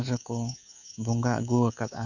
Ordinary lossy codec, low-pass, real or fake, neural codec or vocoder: none; 7.2 kHz; fake; codec, 24 kHz, 3.1 kbps, DualCodec